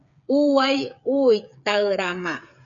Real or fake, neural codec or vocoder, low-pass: fake; codec, 16 kHz, 8 kbps, FreqCodec, smaller model; 7.2 kHz